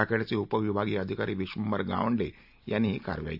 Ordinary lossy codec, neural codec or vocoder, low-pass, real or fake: AAC, 48 kbps; none; 5.4 kHz; real